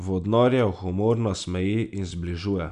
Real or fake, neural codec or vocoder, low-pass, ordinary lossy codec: real; none; 10.8 kHz; none